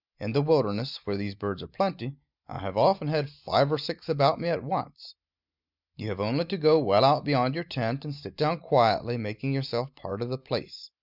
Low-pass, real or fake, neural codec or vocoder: 5.4 kHz; real; none